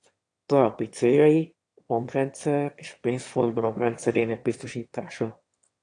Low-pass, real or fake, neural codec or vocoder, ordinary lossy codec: 9.9 kHz; fake; autoencoder, 22.05 kHz, a latent of 192 numbers a frame, VITS, trained on one speaker; AAC, 48 kbps